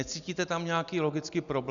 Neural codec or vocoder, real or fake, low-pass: none; real; 7.2 kHz